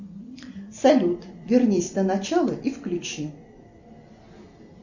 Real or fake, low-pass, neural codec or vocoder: real; 7.2 kHz; none